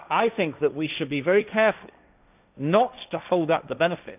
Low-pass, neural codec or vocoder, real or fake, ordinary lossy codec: 3.6 kHz; codec, 16 kHz, 1.1 kbps, Voila-Tokenizer; fake; none